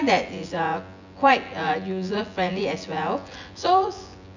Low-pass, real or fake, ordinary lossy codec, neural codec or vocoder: 7.2 kHz; fake; none; vocoder, 24 kHz, 100 mel bands, Vocos